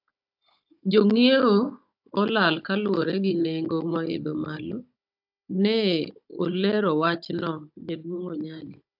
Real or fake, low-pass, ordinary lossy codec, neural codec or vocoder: fake; 5.4 kHz; AAC, 48 kbps; codec, 16 kHz, 16 kbps, FunCodec, trained on Chinese and English, 50 frames a second